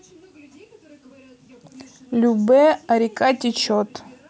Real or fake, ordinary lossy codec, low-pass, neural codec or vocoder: real; none; none; none